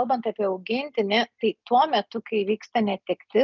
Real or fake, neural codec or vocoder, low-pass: real; none; 7.2 kHz